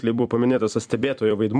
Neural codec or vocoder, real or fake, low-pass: vocoder, 44.1 kHz, 128 mel bands every 256 samples, BigVGAN v2; fake; 9.9 kHz